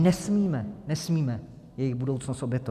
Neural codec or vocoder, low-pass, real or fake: none; 14.4 kHz; real